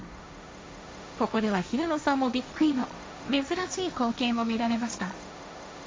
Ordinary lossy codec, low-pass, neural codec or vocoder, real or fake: none; none; codec, 16 kHz, 1.1 kbps, Voila-Tokenizer; fake